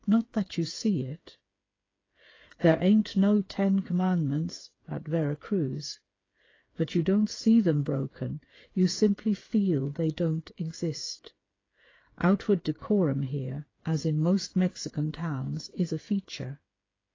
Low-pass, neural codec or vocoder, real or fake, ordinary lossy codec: 7.2 kHz; codec, 16 kHz, 8 kbps, FreqCodec, smaller model; fake; AAC, 32 kbps